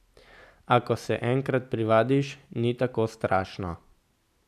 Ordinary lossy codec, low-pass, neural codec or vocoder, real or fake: none; 14.4 kHz; none; real